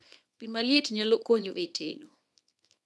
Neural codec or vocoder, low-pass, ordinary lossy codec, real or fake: codec, 24 kHz, 0.9 kbps, WavTokenizer, small release; none; none; fake